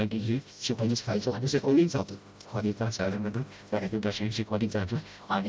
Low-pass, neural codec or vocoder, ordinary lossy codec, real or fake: none; codec, 16 kHz, 0.5 kbps, FreqCodec, smaller model; none; fake